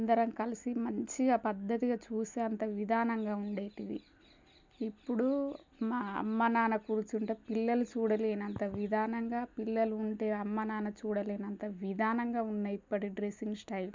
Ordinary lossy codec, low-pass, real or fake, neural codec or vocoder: MP3, 64 kbps; 7.2 kHz; real; none